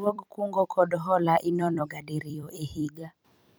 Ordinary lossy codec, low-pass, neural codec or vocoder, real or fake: none; none; vocoder, 44.1 kHz, 128 mel bands every 256 samples, BigVGAN v2; fake